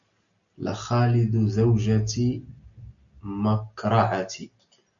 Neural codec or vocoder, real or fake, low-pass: none; real; 7.2 kHz